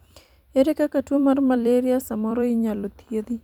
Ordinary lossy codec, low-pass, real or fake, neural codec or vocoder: none; 19.8 kHz; fake; vocoder, 44.1 kHz, 128 mel bands every 256 samples, BigVGAN v2